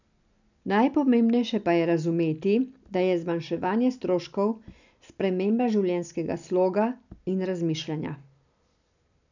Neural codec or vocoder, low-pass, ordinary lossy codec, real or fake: none; 7.2 kHz; none; real